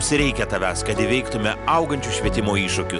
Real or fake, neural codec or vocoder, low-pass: real; none; 10.8 kHz